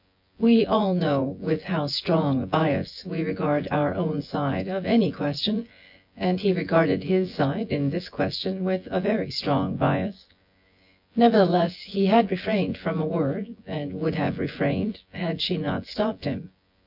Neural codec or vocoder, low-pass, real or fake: vocoder, 24 kHz, 100 mel bands, Vocos; 5.4 kHz; fake